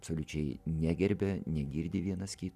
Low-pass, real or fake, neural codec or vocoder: 14.4 kHz; real; none